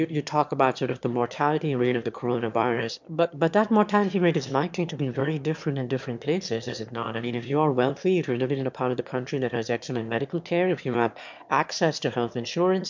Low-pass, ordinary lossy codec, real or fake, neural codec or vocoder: 7.2 kHz; MP3, 64 kbps; fake; autoencoder, 22.05 kHz, a latent of 192 numbers a frame, VITS, trained on one speaker